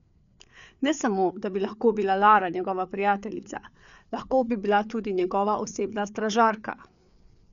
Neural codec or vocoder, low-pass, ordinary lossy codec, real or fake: codec, 16 kHz, 4 kbps, FreqCodec, larger model; 7.2 kHz; none; fake